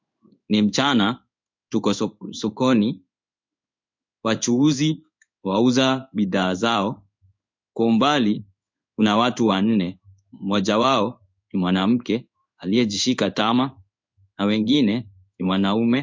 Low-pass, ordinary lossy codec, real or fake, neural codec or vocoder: 7.2 kHz; MP3, 64 kbps; fake; codec, 16 kHz in and 24 kHz out, 1 kbps, XY-Tokenizer